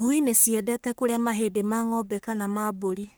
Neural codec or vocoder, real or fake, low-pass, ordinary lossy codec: codec, 44.1 kHz, 3.4 kbps, Pupu-Codec; fake; none; none